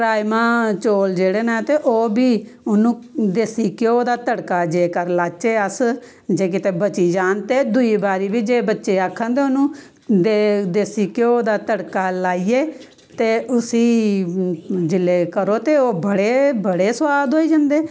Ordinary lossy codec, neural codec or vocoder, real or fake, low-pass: none; none; real; none